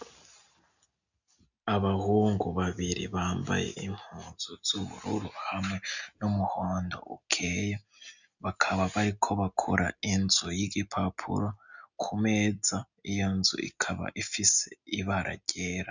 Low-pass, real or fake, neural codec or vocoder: 7.2 kHz; real; none